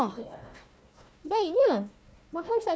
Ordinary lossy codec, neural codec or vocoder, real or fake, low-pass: none; codec, 16 kHz, 1 kbps, FunCodec, trained on Chinese and English, 50 frames a second; fake; none